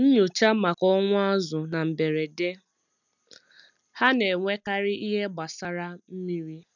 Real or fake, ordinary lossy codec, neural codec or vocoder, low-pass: real; none; none; 7.2 kHz